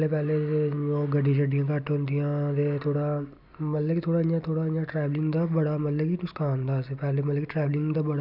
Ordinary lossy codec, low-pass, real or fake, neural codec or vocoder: none; 5.4 kHz; real; none